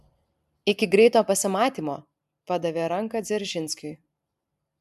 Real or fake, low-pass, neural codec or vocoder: real; 14.4 kHz; none